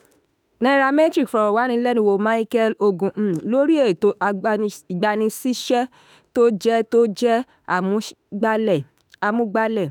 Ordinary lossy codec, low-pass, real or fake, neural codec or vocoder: none; none; fake; autoencoder, 48 kHz, 32 numbers a frame, DAC-VAE, trained on Japanese speech